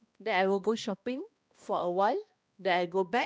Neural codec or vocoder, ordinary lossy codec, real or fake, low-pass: codec, 16 kHz, 1 kbps, X-Codec, HuBERT features, trained on balanced general audio; none; fake; none